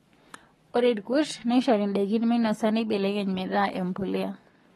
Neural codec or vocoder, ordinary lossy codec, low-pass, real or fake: codec, 44.1 kHz, 7.8 kbps, Pupu-Codec; AAC, 32 kbps; 19.8 kHz; fake